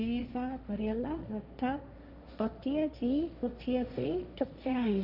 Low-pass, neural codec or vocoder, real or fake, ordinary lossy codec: 5.4 kHz; codec, 16 kHz, 1.1 kbps, Voila-Tokenizer; fake; Opus, 64 kbps